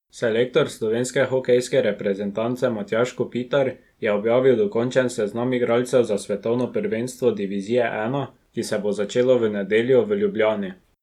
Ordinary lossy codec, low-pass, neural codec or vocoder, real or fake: none; 19.8 kHz; none; real